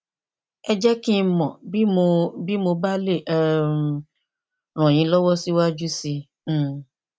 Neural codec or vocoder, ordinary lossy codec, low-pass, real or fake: none; none; none; real